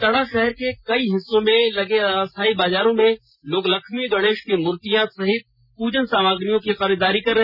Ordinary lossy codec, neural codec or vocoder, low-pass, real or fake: none; none; 5.4 kHz; real